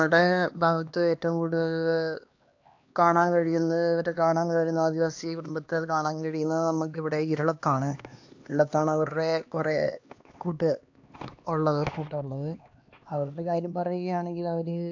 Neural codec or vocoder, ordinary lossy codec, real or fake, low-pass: codec, 16 kHz, 2 kbps, X-Codec, HuBERT features, trained on LibriSpeech; AAC, 48 kbps; fake; 7.2 kHz